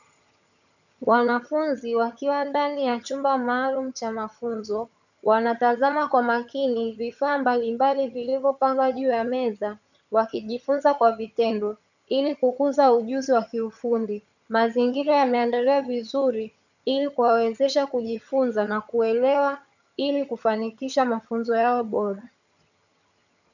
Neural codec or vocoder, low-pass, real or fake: vocoder, 22.05 kHz, 80 mel bands, HiFi-GAN; 7.2 kHz; fake